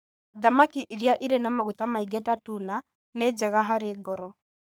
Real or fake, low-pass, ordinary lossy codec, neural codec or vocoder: fake; none; none; codec, 44.1 kHz, 3.4 kbps, Pupu-Codec